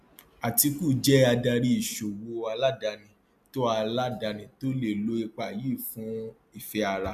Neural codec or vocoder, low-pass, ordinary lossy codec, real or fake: none; 14.4 kHz; MP3, 96 kbps; real